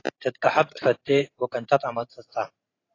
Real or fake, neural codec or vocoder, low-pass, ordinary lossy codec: fake; vocoder, 44.1 kHz, 128 mel bands every 512 samples, BigVGAN v2; 7.2 kHz; AAC, 32 kbps